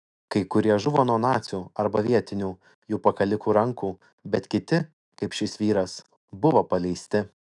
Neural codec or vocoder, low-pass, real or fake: autoencoder, 48 kHz, 128 numbers a frame, DAC-VAE, trained on Japanese speech; 10.8 kHz; fake